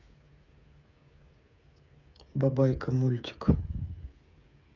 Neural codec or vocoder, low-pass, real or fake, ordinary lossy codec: codec, 16 kHz, 8 kbps, FreqCodec, smaller model; 7.2 kHz; fake; none